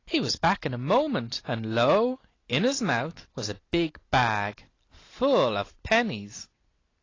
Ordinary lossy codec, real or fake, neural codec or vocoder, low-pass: AAC, 32 kbps; real; none; 7.2 kHz